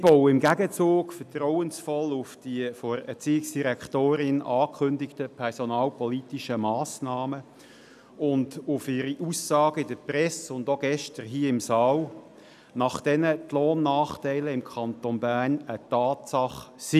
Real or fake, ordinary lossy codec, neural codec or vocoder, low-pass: real; none; none; 14.4 kHz